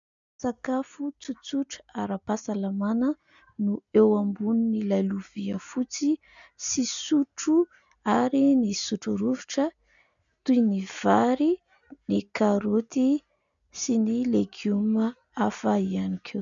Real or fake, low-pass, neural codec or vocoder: real; 7.2 kHz; none